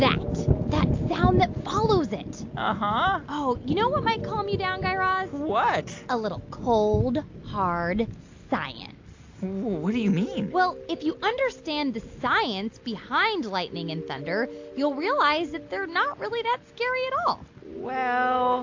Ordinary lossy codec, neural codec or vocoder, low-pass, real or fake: AAC, 48 kbps; none; 7.2 kHz; real